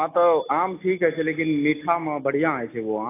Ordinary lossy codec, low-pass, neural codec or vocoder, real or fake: AAC, 24 kbps; 3.6 kHz; none; real